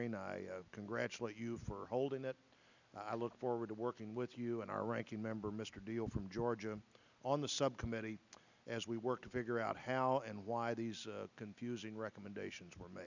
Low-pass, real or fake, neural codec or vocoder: 7.2 kHz; real; none